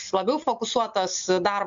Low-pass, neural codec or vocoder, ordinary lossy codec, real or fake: 7.2 kHz; none; MP3, 64 kbps; real